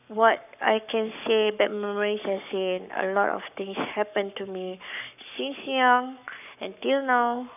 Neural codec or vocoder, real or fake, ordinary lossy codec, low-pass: codec, 44.1 kHz, 7.8 kbps, Pupu-Codec; fake; none; 3.6 kHz